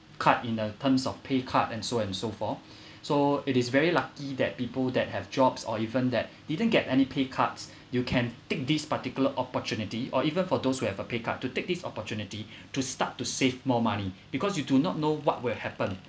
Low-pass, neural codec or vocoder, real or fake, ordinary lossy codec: none; none; real; none